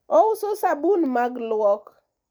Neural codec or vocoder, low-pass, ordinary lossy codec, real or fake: none; none; none; real